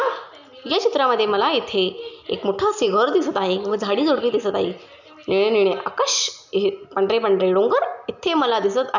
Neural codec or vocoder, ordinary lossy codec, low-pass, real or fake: none; none; 7.2 kHz; real